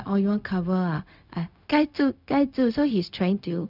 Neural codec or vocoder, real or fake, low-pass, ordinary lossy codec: codec, 16 kHz, 0.4 kbps, LongCat-Audio-Codec; fake; 5.4 kHz; none